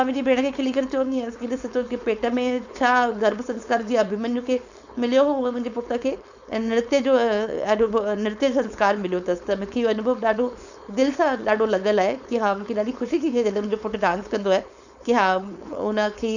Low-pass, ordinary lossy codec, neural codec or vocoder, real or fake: 7.2 kHz; none; codec, 16 kHz, 4.8 kbps, FACodec; fake